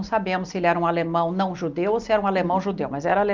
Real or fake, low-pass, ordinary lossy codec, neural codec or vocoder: real; 7.2 kHz; Opus, 24 kbps; none